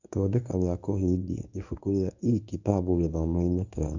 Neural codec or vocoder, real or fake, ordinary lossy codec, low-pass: codec, 24 kHz, 0.9 kbps, WavTokenizer, medium speech release version 1; fake; none; 7.2 kHz